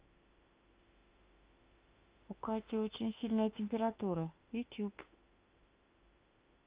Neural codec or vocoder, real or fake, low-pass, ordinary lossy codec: autoencoder, 48 kHz, 32 numbers a frame, DAC-VAE, trained on Japanese speech; fake; 3.6 kHz; Opus, 32 kbps